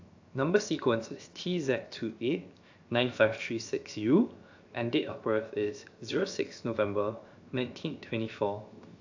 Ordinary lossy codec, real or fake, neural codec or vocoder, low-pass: AAC, 48 kbps; fake; codec, 16 kHz, 0.7 kbps, FocalCodec; 7.2 kHz